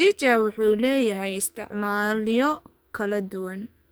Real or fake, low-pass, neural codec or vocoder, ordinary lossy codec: fake; none; codec, 44.1 kHz, 2.6 kbps, SNAC; none